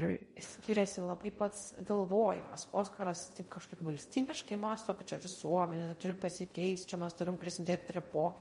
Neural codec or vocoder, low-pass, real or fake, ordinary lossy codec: codec, 16 kHz in and 24 kHz out, 0.6 kbps, FocalCodec, streaming, 2048 codes; 10.8 kHz; fake; MP3, 48 kbps